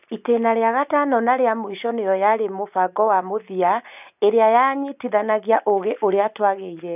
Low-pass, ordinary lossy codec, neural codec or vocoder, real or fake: 3.6 kHz; none; none; real